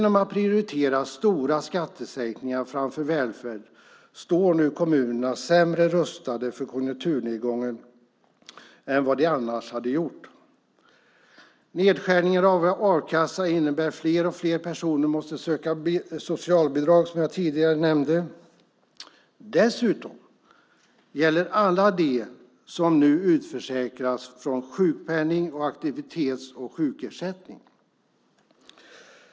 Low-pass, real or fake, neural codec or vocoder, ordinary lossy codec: none; real; none; none